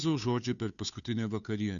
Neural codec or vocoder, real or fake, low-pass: codec, 16 kHz, 2 kbps, FunCodec, trained on Chinese and English, 25 frames a second; fake; 7.2 kHz